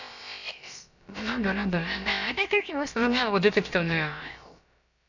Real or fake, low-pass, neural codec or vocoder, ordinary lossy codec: fake; 7.2 kHz; codec, 16 kHz, about 1 kbps, DyCAST, with the encoder's durations; none